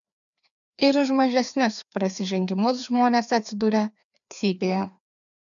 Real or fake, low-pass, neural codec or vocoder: fake; 7.2 kHz; codec, 16 kHz, 2 kbps, FreqCodec, larger model